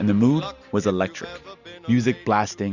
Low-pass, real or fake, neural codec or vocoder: 7.2 kHz; real; none